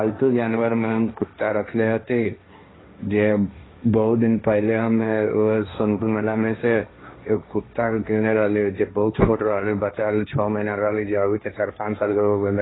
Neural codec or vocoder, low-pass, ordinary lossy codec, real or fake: codec, 16 kHz, 1.1 kbps, Voila-Tokenizer; 7.2 kHz; AAC, 16 kbps; fake